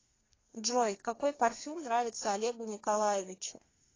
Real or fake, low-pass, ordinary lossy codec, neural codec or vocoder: fake; 7.2 kHz; AAC, 32 kbps; codec, 32 kHz, 1.9 kbps, SNAC